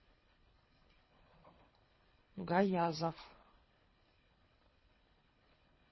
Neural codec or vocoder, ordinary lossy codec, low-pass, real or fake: codec, 24 kHz, 3 kbps, HILCodec; MP3, 24 kbps; 7.2 kHz; fake